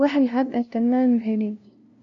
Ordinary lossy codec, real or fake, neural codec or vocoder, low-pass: MP3, 64 kbps; fake; codec, 16 kHz, 0.5 kbps, FunCodec, trained on LibriTTS, 25 frames a second; 7.2 kHz